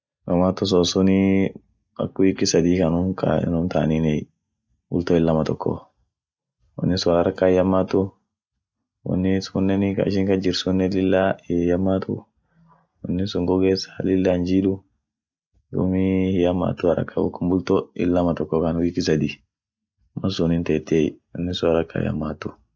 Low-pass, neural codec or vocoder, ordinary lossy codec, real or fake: none; none; none; real